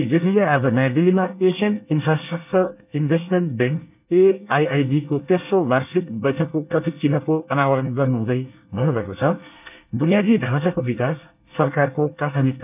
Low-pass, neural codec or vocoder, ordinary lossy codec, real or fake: 3.6 kHz; codec, 24 kHz, 1 kbps, SNAC; none; fake